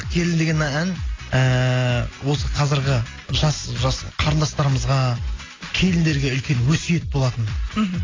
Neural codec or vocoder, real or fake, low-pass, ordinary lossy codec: none; real; 7.2 kHz; AAC, 32 kbps